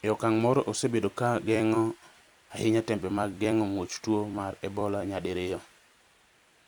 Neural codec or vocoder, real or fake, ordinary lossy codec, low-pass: vocoder, 44.1 kHz, 128 mel bands every 256 samples, BigVGAN v2; fake; none; 19.8 kHz